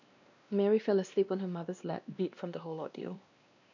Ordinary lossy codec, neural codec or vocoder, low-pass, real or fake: none; codec, 16 kHz, 1 kbps, X-Codec, WavLM features, trained on Multilingual LibriSpeech; 7.2 kHz; fake